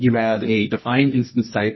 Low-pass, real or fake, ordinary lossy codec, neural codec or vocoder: 7.2 kHz; fake; MP3, 24 kbps; codec, 24 kHz, 0.9 kbps, WavTokenizer, medium music audio release